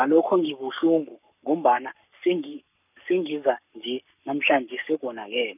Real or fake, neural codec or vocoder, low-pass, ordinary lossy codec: fake; codec, 44.1 kHz, 7.8 kbps, Pupu-Codec; 3.6 kHz; none